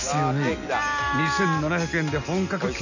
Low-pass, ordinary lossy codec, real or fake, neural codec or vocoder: 7.2 kHz; none; real; none